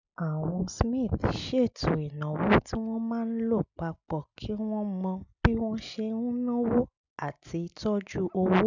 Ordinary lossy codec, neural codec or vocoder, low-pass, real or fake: none; none; 7.2 kHz; real